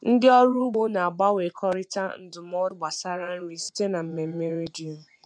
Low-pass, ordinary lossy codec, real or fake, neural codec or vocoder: 9.9 kHz; none; fake; vocoder, 24 kHz, 100 mel bands, Vocos